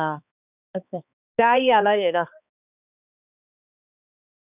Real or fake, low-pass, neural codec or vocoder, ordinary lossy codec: fake; 3.6 kHz; codec, 16 kHz, 2 kbps, X-Codec, HuBERT features, trained on balanced general audio; none